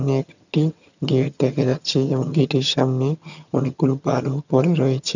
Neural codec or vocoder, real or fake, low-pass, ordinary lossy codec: vocoder, 22.05 kHz, 80 mel bands, HiFi-GAN; fake; 7.2 kHz; none